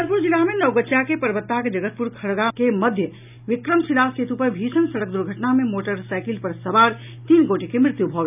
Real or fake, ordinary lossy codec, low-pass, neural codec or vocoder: real; none; 3.6 kHz; none